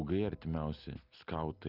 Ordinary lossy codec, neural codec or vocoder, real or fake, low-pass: Opus, 32 kbps; none; real; 5.4 kHz